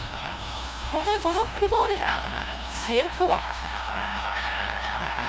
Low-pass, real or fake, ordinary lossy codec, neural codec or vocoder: none; fake; none; codec, 16 kHz, 0.5 kbps, FunCodec, trained on LibriTTS, 25 frames a second